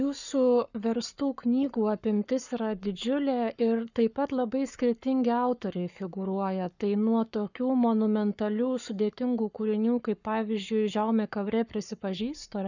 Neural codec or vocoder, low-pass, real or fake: codec, 44.1 kHz, 7.8 kbps, Pupu-Codec; 7.2 kHz; fake